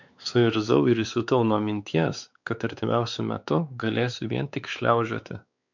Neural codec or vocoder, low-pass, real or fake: codec, 16 kHz, 4 kbps, X-Codec, WavLM features, trained on Multilingual LibriSpeech; 7.2 kHz; fake